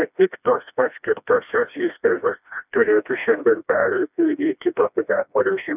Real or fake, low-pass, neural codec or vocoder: fake; 3.6 kHz; codec, 16 kHz, 1 kbps, FreqCodec, smaller model